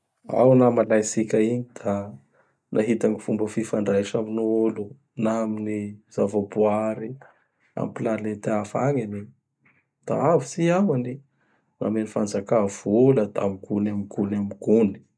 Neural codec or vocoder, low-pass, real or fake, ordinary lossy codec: none; none; real; none